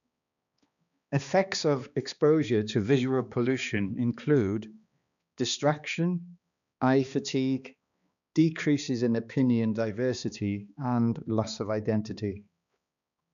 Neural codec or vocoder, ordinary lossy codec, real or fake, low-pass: codec, 16 kHz, 2 kbps, X-Codec, HuBERT features, trained on balanced general audio; none; fake; 7.2 kHz